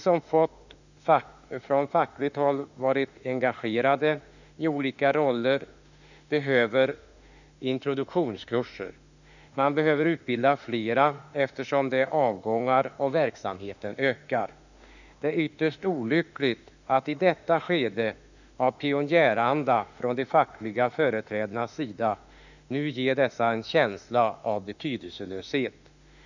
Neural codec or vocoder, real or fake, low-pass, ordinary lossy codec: autoencoder, 48 kHz, 32 numbers a frame, DAC-VAE, trained on Japanese speech; fake; 7.2 kHz; none